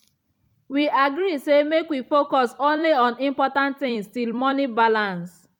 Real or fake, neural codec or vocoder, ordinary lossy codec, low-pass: fake; vocoder, 44.1 kHz, 128 mel bands every 256 samples, BigVGAN v2; none; 19.8 kHz